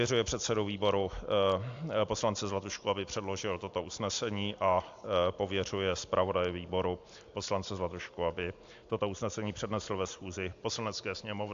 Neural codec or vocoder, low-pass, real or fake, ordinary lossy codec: none; 7.2 kHz; real; AAC, 64 kbps